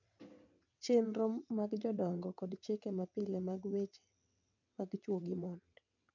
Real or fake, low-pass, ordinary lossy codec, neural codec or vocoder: fake; 7.2 kHz; none; vocoder, 22.05 kHz, 80 mel bands, WaveNeXt